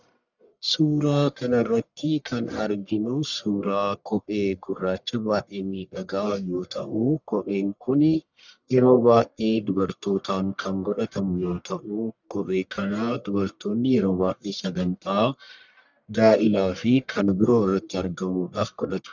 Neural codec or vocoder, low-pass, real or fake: codec, 44.1 kHz, 1.7 kbps, Pupu-Codec; 7.2 kHz; fake